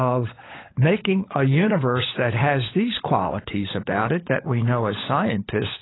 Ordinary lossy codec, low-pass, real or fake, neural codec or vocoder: AAC, 16 kbps; 7.2 kHz; fake; codec, 16 kHz, 16 kbps, FunCodec, trained on LibriTTS, 50 frames a second